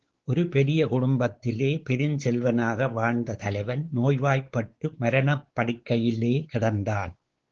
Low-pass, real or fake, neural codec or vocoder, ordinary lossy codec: 7.2 kHz; fake; codec, 16 kHz, 4 kbps, X-Codec, WavLM features, trained on Multilingual LibriSpeech; Opus, 16 kbps